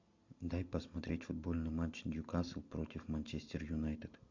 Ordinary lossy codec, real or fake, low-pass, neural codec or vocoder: MP3, 48 kbps; real; 7.2 kHz; none